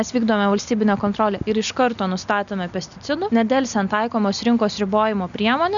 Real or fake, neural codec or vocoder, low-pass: real; none; 7.2 kHz